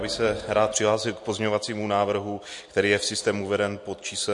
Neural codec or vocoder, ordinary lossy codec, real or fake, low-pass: none; MP3, 48 kbps; real; 10.8 kHz